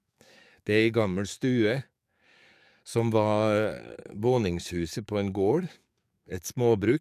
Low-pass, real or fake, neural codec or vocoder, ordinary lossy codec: 14.4 kHz; fake; codec, 44.1 kHz, 7.8 kbps, DAC; none